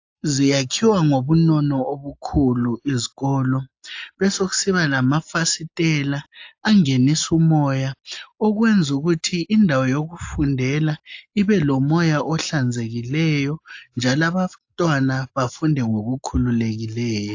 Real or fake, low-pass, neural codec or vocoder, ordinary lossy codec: real; 7.2 kHz; none; AAC, 48 kbps